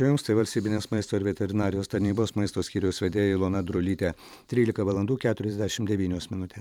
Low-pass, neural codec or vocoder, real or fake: 19.8 kHz; vocoder, 44.1 kHz, 128 mel bands every 512 samples, BigVGAN v2; fake